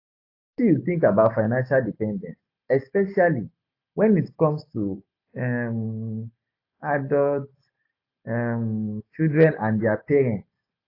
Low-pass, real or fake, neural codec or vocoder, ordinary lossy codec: 5.4 kHz; real; none; AAC, 32 kbps